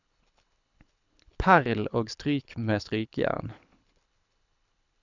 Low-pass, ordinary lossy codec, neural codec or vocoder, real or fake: 7.2 kHz; none; codec, 24 kHz, 6 kbps, HILCodec; fake